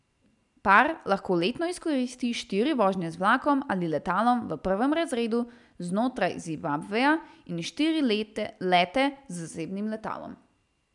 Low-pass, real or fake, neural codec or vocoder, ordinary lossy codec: 10.8 kHz; real; none; none